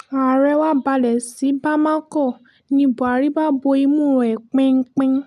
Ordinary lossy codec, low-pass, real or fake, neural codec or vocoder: none; 14.4 kHz; real; none